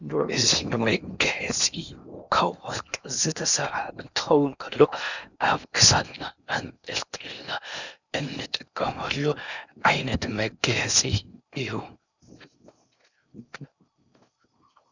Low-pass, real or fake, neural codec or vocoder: 7.2 kHz; fake; codec, 16 kHz in and 24 kHz out, 0.8 kbps, FocalCodec, streaming, 65536 codes